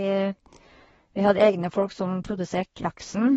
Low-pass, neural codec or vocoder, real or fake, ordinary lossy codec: 10.8 kHz; codec, 24 kHz, 1 kbps, SNAC; fake; AAC, 24 kbps